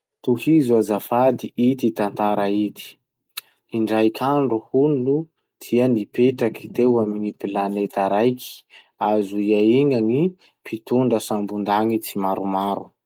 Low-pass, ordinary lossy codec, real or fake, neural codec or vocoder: 19.8 kHz; Opus, 32 kbps; real; none